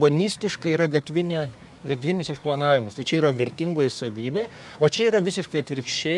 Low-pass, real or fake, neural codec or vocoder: 10.8 kHz; fake; codec, 24 kHz, 1 kbps, SNAC